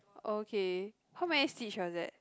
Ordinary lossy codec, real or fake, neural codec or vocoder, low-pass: none; real; none; none